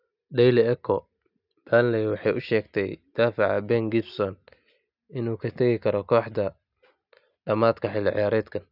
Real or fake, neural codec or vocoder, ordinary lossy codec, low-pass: real; none; none; 5.4 kHz